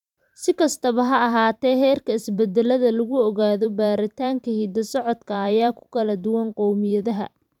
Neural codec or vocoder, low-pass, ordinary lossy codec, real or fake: vocoder, 44.1 kHz, 128 mel bands every 512 samples, BigVGAN v2; 19.8 kHz; none; fake